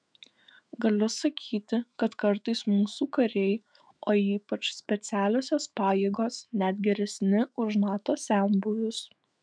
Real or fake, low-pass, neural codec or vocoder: fake; 9.9 kHz; autoencoder, 48 kHz, 128 numbers a frame, DAC-VAE, trained on Japanese speech